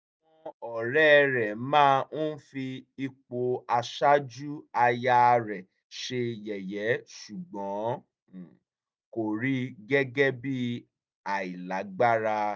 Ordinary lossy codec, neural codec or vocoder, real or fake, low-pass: none; none; real; none